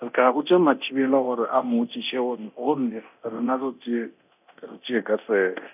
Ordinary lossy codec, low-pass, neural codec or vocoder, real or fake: none; 3.6 kHz; codec, 24 kHz, 0.9 kbps, DualCodec; fake